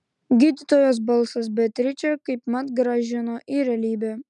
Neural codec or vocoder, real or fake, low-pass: none; real; 10.8 kHz